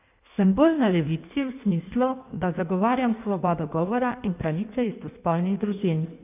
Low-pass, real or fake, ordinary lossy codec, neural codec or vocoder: 3.6 kHz; fake; none; codec, 16 kHz in and 24 kHz out, 1.1 kbps, FireRedTTS-2 codec